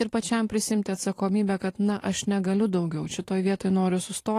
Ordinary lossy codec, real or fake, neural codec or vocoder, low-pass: AAC, 48 kbps; fake; vocoder, 44.1 kHz, 128 mel bands every 512 samples, BigVGAN v2; 14.4 kHz